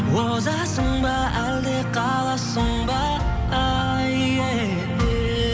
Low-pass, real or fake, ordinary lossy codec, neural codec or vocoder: none; real; none; none